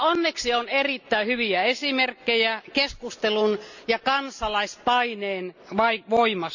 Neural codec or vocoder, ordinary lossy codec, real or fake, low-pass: none; none; real; 7.2 kHz